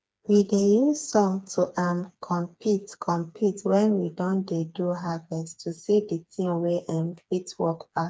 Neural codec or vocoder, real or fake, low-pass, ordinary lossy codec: codec, 16 kHz, 4 kbps, FreqCodec, smaller model; fake; none; none